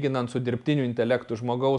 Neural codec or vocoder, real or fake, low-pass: none; real; 10.8 kHz